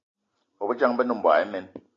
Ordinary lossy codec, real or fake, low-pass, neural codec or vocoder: AAC, 32 kbps; real; 7.2 kHz; none